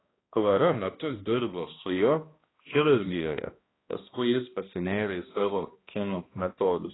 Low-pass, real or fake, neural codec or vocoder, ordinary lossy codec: 7.2 kHz; fake; codec, 16 kHz, 1 kbps, X-Codec, HuBERT features, trained on balanced general audio; AAC, 16 kbps